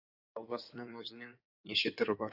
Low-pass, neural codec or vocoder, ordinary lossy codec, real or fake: 5.4 kHz; codec, 16 kHz in and 24 kHz out, 2.2 kbps, FireRedTTS-2 codec; AAC, 48 kbps; fake